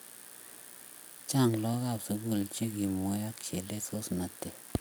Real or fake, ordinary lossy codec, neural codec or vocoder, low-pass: real; none; none; none